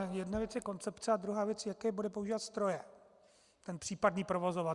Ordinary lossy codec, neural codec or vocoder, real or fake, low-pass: Opus, 64 kbps; none; real; 10.8 kHz